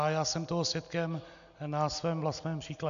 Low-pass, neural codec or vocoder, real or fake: 7.2 kHz; none; real